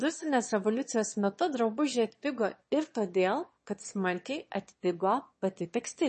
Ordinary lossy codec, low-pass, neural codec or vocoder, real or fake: MP3, 32 kbps; 9.9 kHz; autoencoder, 22.05 kHz, a latent of 192 numbers a frame, VITS, trained on one speaker; fake